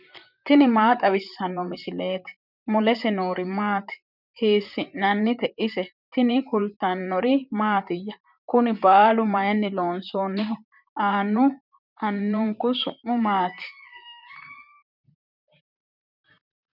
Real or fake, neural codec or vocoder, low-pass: fake; vocoder, 44.1 kHz, 128 mel bands, Pupu-Vocoder; 5.4 kHz